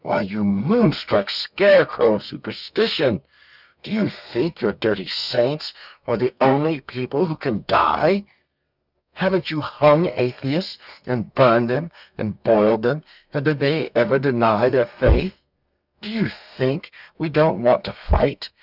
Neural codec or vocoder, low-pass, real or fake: codec, 32 kHz, 1.9 kbps, SNAC; 5.4 kHz; fake